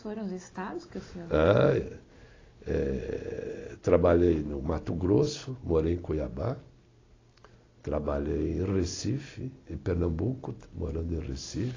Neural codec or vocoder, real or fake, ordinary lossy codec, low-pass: none; real; AAC, 32 kbps; 7.2 kHz